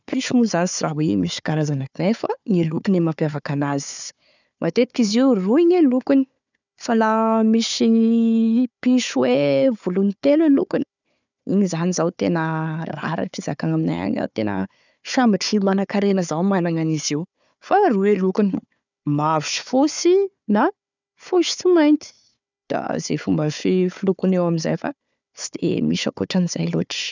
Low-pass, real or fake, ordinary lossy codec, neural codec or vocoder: 7.2 kHz; fake; none; codec, 16 kHz, 4 kbps, FunCodec, trained on Chinese and English, 50 frames a second